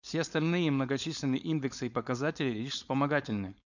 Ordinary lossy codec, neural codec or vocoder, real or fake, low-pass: none; codec, 16 kHz, 4.8 kbps, FACodec; fake; 7.2 kHz